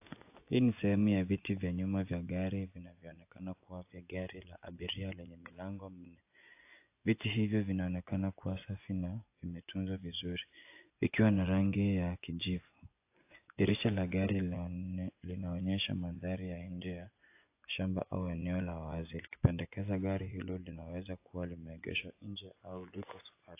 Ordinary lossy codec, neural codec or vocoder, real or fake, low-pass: AAC, 24 kbps; vocoder, 24 kHz, 100 mel bands, Vocos; fake; 3.6 kHz